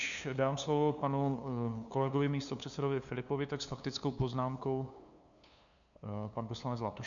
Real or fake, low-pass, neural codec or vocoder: fake; 7.2 kHz; codec, 16 kHz, 2 kbps, FunCodec, trained on LibriTTS, 25 frames a second